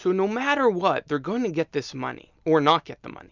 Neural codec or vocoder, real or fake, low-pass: none; real; 7.2 kHz